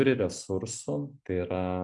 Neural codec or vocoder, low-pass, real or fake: none; 10.8 kHz; real